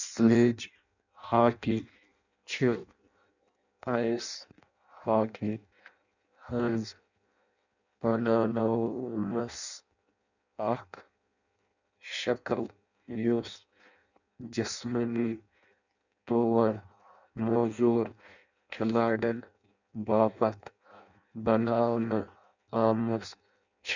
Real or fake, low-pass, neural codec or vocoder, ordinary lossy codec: fake; 7.2 kHz; codec, 16 kHz in and 24 kHz out, 0.6 kbps, FireRedTTS-2 codec; none